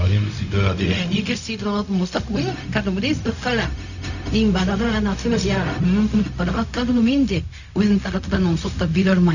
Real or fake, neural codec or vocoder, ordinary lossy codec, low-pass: fake; codec, 16 kHz, 0.4 kbps, LongCat-Audio-Codec; none; 7.2 kHz